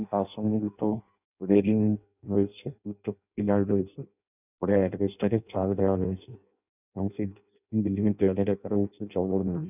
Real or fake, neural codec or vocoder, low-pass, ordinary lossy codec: fake; codec, 16 kHz in and 24 kHz out, 0.6 kbps, FireRedTTS-2 codec; 3.6 kHz; none